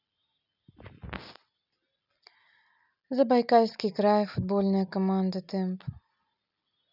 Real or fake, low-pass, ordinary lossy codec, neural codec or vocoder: real; 5.4 kHz; none; none